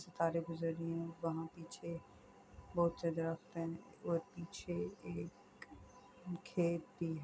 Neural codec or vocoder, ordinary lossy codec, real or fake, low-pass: none; none; real; none